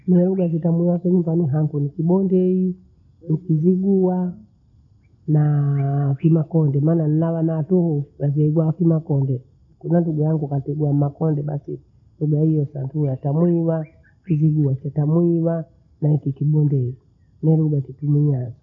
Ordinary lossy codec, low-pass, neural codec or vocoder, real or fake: none; 7.2 kHz; none; real